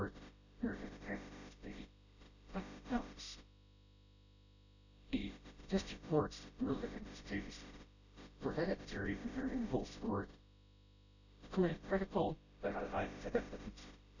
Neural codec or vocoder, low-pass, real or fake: codec, 16 kHz, 0.5 kbps, FreqCodec, smaller model; 7.2 kHz; fake